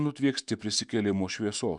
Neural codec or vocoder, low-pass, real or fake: autoencoder, 48 kHz, 128 numbers a frame, DAC-VAE, trained on Japanese speech; 10.8 kHz; fake